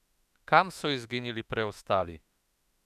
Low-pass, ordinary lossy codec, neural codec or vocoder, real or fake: 14.4 kHz; none; autoencoder, 48 kHz, 32 numbers a frame, DAC-VAE, trained on Japanese speech; fake